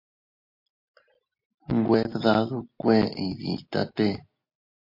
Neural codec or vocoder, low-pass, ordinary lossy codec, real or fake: none; 5.4 kHz; MP3, 32 kbps; real